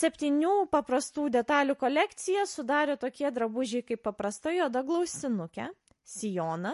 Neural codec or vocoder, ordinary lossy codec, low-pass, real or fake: none; MP3, 48 kbps; 14.4 kHz; real